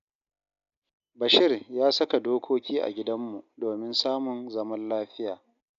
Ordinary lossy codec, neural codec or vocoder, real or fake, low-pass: none; none; real; 7.2 kHz